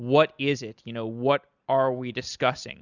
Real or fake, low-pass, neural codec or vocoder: real; 7.2 kHz; none